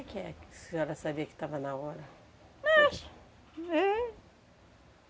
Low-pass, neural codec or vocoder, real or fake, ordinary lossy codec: none; none; real; none